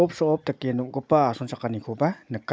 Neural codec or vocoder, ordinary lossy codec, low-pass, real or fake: none; none; none; real